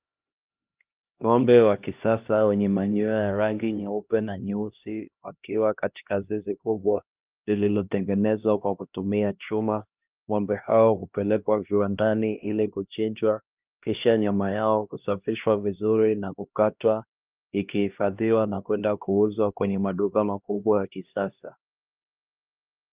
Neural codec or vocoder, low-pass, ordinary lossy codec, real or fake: codec, 16 kHz, 1 kbps, X-Codec, HuBERT features, trained on LibriSpeech; 3.6 kHz; Opus, 24 kbps; fake